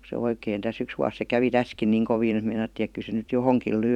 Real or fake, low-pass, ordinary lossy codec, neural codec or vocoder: fake; 19.8 kHz; none; vocoder, 44.1 kHz, 128 mel bands every 256 samples, BigVGAN v2